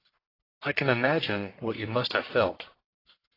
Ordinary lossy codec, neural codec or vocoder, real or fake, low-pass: AAC, 24 kbps; codec, 44.1 kHz, 1.7 kbps, Pupu-Codec; fake; 5.4 kHz